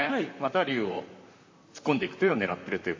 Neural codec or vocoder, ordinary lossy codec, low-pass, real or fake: vocoder, 44.1 kHz, 128 mel bands, Pupu-Vocoder; MP3, 32 kbps; 7.2 kHz; fake